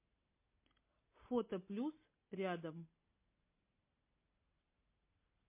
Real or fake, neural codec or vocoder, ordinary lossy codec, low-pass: real; none; MP3, 24 kbps; 3.6 kHz